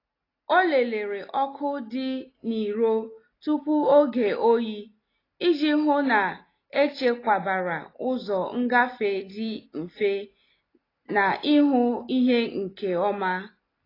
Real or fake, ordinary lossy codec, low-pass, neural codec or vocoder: real; AAC, 24 kbps; 5.4 kHz; none